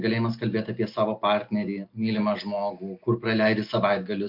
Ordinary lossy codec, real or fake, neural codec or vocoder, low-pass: MP3, 48 kbps; real; none; 5.4 kHz